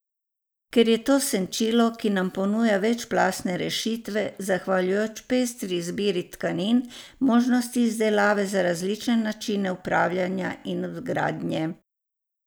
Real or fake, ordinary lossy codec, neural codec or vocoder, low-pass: real; none; none; none